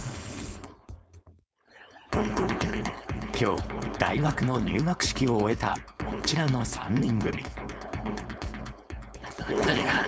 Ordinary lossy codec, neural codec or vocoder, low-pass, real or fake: none; codec, 16 kHz, 4.8 kbps, FACodec; none; fake